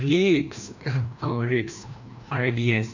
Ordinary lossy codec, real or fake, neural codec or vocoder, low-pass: none; fake; codec, 16 kHz, 1 kbps, FreqCodec, larger model; 7.2 kHz